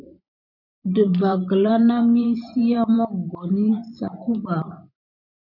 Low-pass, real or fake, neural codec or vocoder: 5.4 kHz; fake; vocoder, 44.1 kHz, 128 mel bands every 512 samples, BigVGAN v2